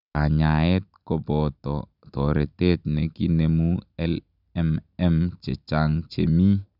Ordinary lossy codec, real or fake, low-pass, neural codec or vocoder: none; real; 5.4 kHz; none